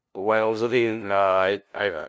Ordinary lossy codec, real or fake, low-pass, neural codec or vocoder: none; fake; none; codec, 16 kHz, 0.5 kbps, FunCodec, trained on LibriTTS, 25 frames a second